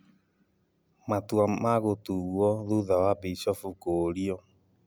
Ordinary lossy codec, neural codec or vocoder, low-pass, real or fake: none; none; none; real